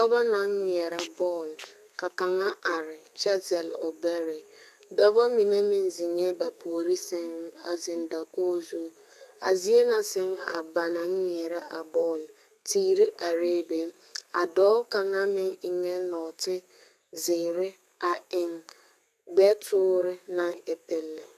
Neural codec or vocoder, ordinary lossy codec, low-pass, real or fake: codec, 32 kHz, 1.9 kbps, SNAC; AAC, 96 kbps; 14.4 kHz; fake